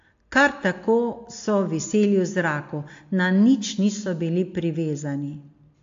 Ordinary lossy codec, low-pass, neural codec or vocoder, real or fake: AAC, 48 kbps; 7.2 kHz; none; real